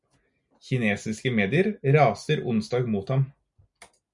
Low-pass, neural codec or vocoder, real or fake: 10.8 kHz; none; real